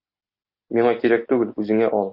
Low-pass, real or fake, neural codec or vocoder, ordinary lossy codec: 5.4 kHz; real; none; AAC, 48 kbps